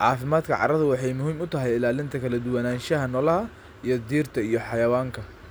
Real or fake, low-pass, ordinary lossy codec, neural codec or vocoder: real; none; none; none